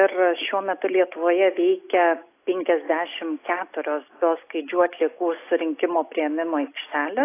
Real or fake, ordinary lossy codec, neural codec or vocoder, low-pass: real; AAC, 24 kbps; none; 3.6 kHz